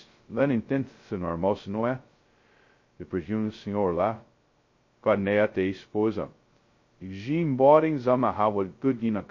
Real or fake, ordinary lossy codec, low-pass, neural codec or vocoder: fake; MP3, 32 kbps; 7.2 kHz; codec, 16 kHz, 0.2 kbps, FocalCodec